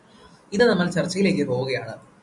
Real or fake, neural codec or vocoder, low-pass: real; none; 10.8 kHz